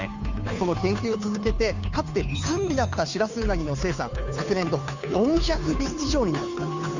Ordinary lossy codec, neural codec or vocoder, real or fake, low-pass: none; codec, 16 kHz, 2 kbps, FunCodec, trained on Chinese and English, 25 frames a second; fake; 7.2 kHz